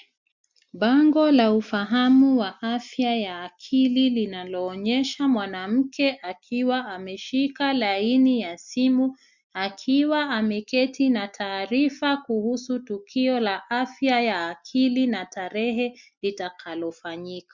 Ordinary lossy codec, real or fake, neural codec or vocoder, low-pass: Opus, 64 kbps; real; none; 7.2 kHz